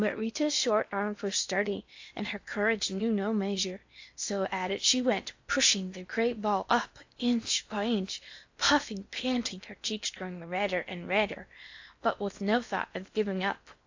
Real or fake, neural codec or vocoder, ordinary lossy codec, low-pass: fake; codec, 16 kHz in and 24 kHz out, 0.8 kbps, FocalCodec, streaming, 65536 codes; AAC, 48 kbps; 7.2 kHz